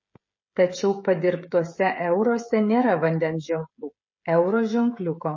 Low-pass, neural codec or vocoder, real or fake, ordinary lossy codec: 7.2 kHz; codec, 16 kHz, 16 kbps, FreqCodec, smaller model; fake; MP3, 32 kbps